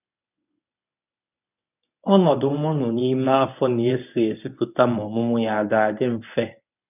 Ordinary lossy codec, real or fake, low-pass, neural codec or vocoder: none; fake; 3.6 kHz; codec, 24 kHz, 0.9 kbps, WavTokenizer, medium speech release version 1